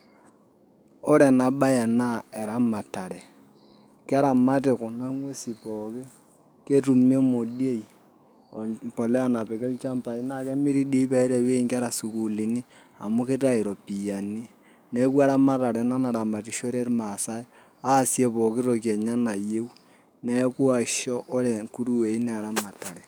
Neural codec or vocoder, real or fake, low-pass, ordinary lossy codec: codec, 44.1 kHz, 7.8 kbps, DAC; fake; none; none